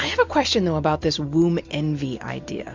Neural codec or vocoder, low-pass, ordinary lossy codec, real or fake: none; 7.2 kHz; MP3, 64 kbps; real